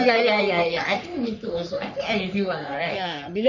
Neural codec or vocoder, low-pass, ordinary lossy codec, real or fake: codec, 44.1 kHz, 3.4 kbps, Pupu-Codec; 7.2 kHz; none; fake